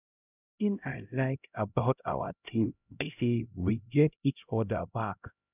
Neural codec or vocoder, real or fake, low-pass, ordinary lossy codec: codec, 16 kHz, 0.5 kbps, X-Codec, HuBERT features, trained on LibriSpeech; fake; 3.6 kHz; none